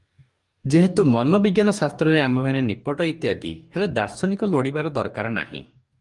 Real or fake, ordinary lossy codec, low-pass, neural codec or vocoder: fake; Opus, 32 kbps; 10.8 kHz; codec, 44.1 kHz, 2.6 kbps, DAC